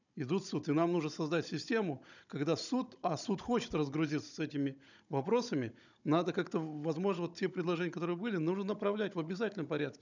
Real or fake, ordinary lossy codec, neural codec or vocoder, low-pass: fake; none; codec, 16 kHz, 16 kbps, FunCodec, trained on Chinese and English, 50 frames a second; 7.2 kHz